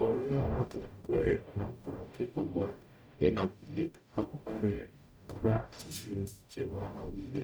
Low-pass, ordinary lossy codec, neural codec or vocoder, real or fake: none; none; codec, 44.1 kHz, 0.9 kbps, DAC; fake